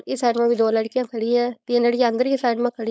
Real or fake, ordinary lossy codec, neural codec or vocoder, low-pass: fake; none; codec, 16 kHz, 4.8 kbps, FACodec; none